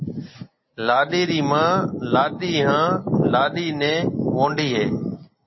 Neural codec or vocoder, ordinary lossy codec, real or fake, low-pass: none; MP3, 24 kbps; real; 7.2 kHz